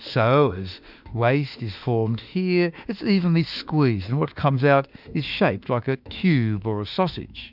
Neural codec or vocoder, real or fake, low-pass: autoencoder, 48 kHz, 32 numbers a frame, DAC-VAE, trained on Japanese speech; fake; 5.4 kHz